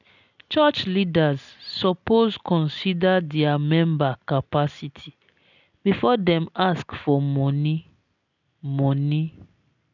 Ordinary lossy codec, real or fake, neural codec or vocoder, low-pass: none; real; none; 7.2 kHz